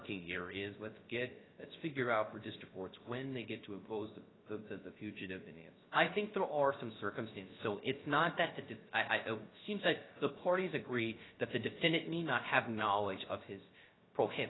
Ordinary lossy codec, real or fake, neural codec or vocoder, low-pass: AAC, 16 kbps; fake; codec, 16 kHz, 0.3 kbps, FocalCodec; 7.2 kHz